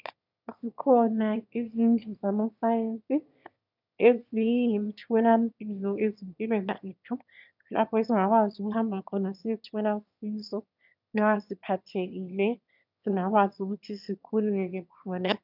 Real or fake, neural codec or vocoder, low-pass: fake; autoencoder, 22.05 kHz, a latent of 192 numbers a frame, VITS, trained on one speaker; 5.4 kHz